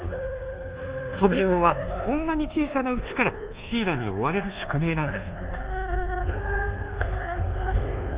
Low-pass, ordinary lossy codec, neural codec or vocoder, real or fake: 3.6 kHz; Opus, 32 kbps; codec, 24 kHz, 1.2 kbps, DualCodec; fake